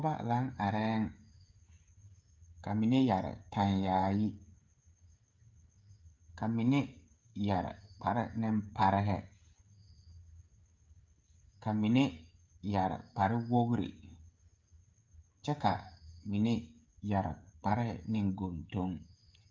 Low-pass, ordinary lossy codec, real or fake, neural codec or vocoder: 7.2 kHz; Opus, 24 kbps; fake; codec, 16 kHz, 16 kbps, FreqCodec, smaller model